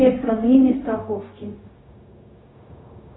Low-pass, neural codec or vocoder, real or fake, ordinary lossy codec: 7.2 kHz; codec, 16 kHz, 0.4 kbps, LongCat-Audio-Codec; fake; AAC, 16 kbps